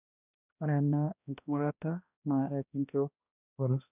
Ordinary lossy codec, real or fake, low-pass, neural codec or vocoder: none; fake; 3.6 kHz; codec, 16 kHz, 1 kbps, X-Codec, HuBERT features, trained on balanced general audio